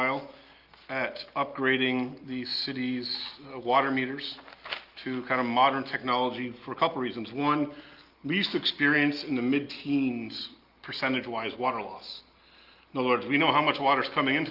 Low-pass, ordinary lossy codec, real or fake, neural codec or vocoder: 5.4 kHz; Opus, 32 kbps; real; none